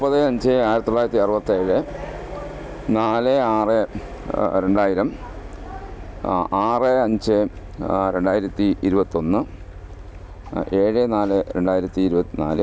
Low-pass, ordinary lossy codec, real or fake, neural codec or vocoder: none; none; real; none